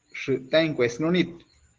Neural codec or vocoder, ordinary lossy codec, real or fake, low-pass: none; Opus, 16 kbps; real; 7.2 kHz